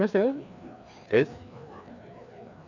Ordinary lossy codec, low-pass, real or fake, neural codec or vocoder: none; 7.2 kHz; fake; codec, 16 kHz, 2 kbps, FreqCodec, larger model